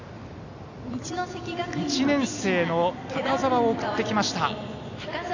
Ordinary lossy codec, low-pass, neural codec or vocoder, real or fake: none; 7.2 kHz; none; real